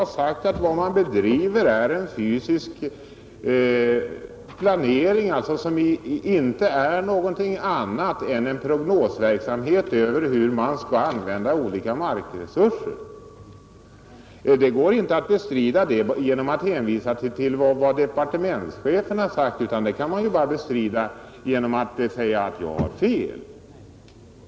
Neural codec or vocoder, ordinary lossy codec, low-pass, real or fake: none; none; none; real